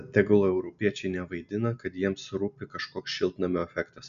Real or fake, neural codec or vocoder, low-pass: real; none; 7.2 kHz